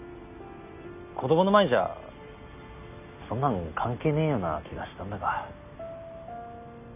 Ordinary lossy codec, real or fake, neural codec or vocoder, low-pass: none; real; none; 3.6 kHz